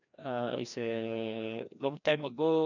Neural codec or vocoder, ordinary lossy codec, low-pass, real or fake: codec, 16 kHz, 1 kbps, FreqCodec, larger model; none; 7.2 kHz; fake